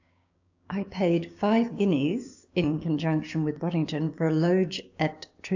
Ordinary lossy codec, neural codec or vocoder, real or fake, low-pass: AAC, 48 kbps; codec, 16 kHz, 6 kbps, DAC; fake; 7.2 kHz